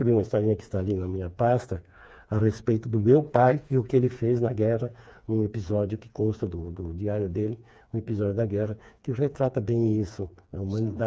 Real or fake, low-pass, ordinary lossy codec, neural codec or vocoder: fake; none; none; codec, 16 kHz, 4 kbps, FreqCodec, smaller model